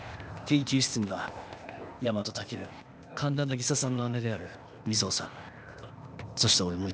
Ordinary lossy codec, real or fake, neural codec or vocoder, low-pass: none; fake; codec, 16 kHz, 0.8 kbps, ZipCodec; none